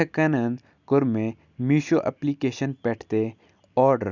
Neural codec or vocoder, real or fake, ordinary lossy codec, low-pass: none; real; none; 7.2 kHz